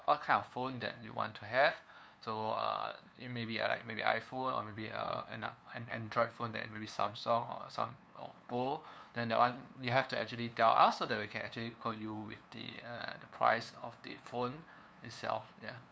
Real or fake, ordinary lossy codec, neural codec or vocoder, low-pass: fake; none; codec, 16 kHz, 2 kbps, FunCodec, trained on LibriTTS, 25 frames a second; none